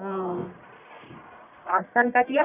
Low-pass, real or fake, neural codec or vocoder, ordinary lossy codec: 3.6 kHz; fake; codec, 44.1 kHz, 1.7 kbps, Pupu-Codec; none